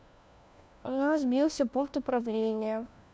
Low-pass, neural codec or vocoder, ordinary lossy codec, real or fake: none; codec, 16 kHz, 1 kbps, FunCodec, trained on LibriTTS, 50 frames a second; none; fake